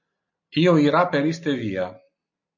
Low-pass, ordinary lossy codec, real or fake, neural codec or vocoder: 7.2 kHz; MP3, 48 kbps; real; none